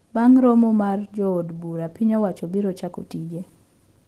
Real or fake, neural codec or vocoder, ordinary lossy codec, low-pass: real; none; Opus, 16 kbps; 14.4 kHz